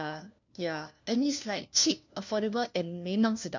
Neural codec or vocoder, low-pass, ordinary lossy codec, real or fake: codec, 16 kHz, 1 kbps, FunCodec, trained on LibriTTS, 50 frames a second; 7.2 kHz; none; fake